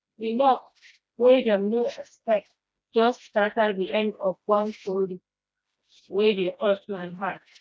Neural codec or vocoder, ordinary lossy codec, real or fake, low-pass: codec, 16 kHz, 1 kbps, FreqCodec, smaller model; none; fake; none